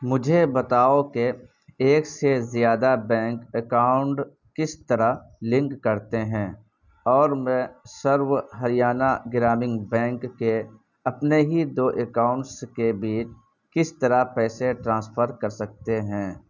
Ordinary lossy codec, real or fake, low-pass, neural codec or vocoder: none; real; 7.2 kHz; none